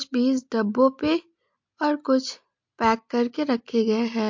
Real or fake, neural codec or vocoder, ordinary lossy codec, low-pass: real; none; MP3, 48 kbps; 7.2 kHz